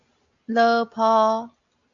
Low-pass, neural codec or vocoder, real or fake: 7.2 kHz; none; real